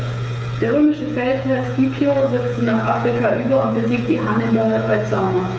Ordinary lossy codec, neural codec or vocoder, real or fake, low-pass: none; codec, 16 kHz, 8 kbps, FreqCodec, smaller model; fake; none